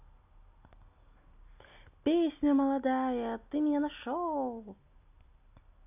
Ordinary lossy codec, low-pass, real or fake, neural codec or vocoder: AAC, 32 kbps; 3.6 kHz; real; none